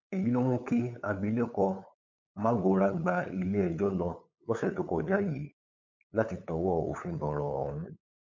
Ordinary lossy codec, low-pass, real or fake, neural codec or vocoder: MP3, 48 kbps; 7.2 kHz; fake; codec, 16 kHz, 8 kbps, FunCodec, trained on LibriTTS, 25 frames a second